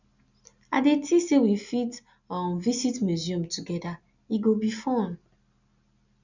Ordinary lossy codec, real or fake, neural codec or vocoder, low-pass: none; real; none; 7.2 kHz